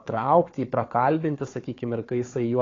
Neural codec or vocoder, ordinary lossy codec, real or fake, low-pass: codec, 16 kHz, 4 kbps, FunCodec, trained on Chinese and English, 50 frames a second; AAC, 32 kbps; fake; 7.2 kHz